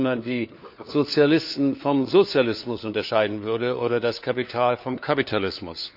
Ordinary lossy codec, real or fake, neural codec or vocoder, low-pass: none; fake; codec, 16 kHz, 4 kbps, FunCodec, trained on LibriTTS, 50 frames a second; 5.4 kHz